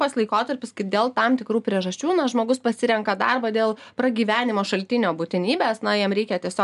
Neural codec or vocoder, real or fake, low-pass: none; real; 10.8 kHz